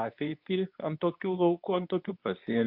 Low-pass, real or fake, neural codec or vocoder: 5.4 kHz; fake; codec, 24 kHz, 0.9 kbps, WavTokenizer, medium speech release version 2